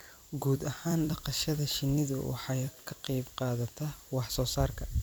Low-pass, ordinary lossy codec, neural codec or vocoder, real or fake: none; none; vocoder, 44.1 kHz, 128 mel bands every 256 samples, BigVGAN v2; fake